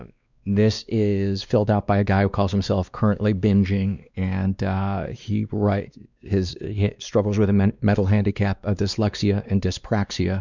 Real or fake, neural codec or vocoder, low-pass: fake; codec, 16 kHz, 2 kbps, X-Codec, WavLM features, trained on Multilingual LibriSpeech; 7.2 kHz